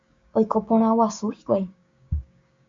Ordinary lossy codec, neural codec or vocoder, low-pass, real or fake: MP3, 48 kbps; codec, 16 kHz, 6 kbps, DAC; 7.2 kHz; fake